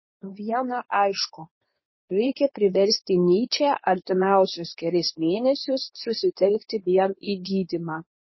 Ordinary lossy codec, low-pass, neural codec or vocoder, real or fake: MP3, 24 kbps; 7.2 kHz; codec, 24 kHz, 0.9 kbps, WavTokenizer, medium speech release version 2; fake